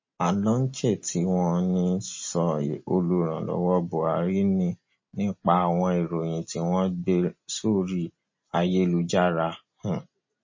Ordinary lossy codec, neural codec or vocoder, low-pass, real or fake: MP3, 32 kbps; none; 7.2 kHz; real